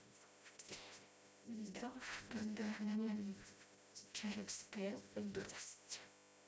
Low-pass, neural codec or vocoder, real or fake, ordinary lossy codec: none; codec, 16 kHz, 0.5 kbps, FreqCodec, smaller model; fake; none